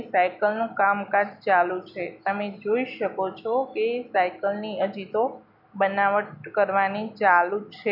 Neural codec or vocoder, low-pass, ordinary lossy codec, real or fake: none; 5.4 kHz; none; real